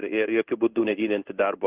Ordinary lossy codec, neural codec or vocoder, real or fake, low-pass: Opus, 32 kbps; codec, 16 kHz in and 24 kHz out, 1 kbps, XY-Tokenizer; fake; 3.6 kHz